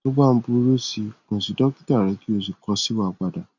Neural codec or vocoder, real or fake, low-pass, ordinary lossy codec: none; real; 7.2 kHz; none